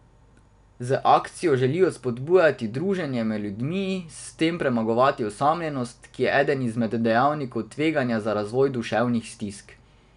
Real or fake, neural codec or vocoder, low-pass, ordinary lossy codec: real; none; 10.8 kHz; none